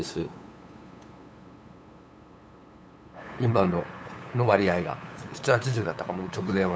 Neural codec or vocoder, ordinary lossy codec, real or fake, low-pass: codec, 16 kHz, 8 kbps, FunCodec, trained on LibriTTS, 25 frames a second; none; fake; none